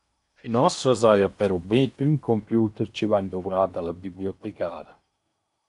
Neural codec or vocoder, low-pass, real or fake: codec, 16 kHz in and 24 kHz out, 0.8 kbps, FocalCodec, streaming, 65536 codes; 10.8 kHz; fake